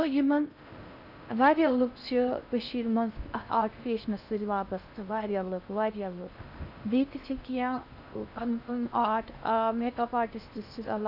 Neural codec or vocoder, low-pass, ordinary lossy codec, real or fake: codec, 16 kHz in and 24 kHz out, 0.6 kbps, FocalCodec, streaming, 4096 codes; 5.4 kHz; none; fake